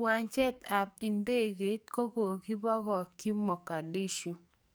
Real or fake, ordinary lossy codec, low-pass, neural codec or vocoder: fake; none; none; codec, 44.1 kHz, 2.6 kbps, SNAC